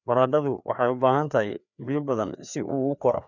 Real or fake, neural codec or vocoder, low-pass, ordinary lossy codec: fake; codec, 16 kHz, 2 kbps, FreqCodec, larger model; 7.2 kHz; none